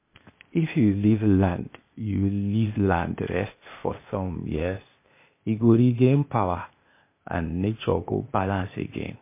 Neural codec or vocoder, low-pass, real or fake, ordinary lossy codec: codec, 16 kHz, 0.8 kbps, ZipCodec; 3.6 kHz; fake; MP3, 24 kbps